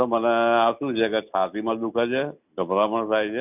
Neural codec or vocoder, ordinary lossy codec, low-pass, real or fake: none; none; 3.6 kHz; real